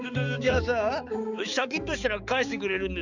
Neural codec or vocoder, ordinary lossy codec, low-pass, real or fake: codec, 16 kHz, 4 kbps, X-Codec, HuBERT features, trained on balanced general audio; none; 7.2 kHz; fake